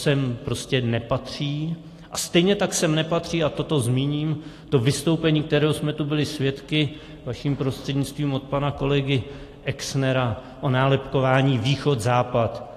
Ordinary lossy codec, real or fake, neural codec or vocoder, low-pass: AAC, 48 kbps; real; none; 14.4 kHz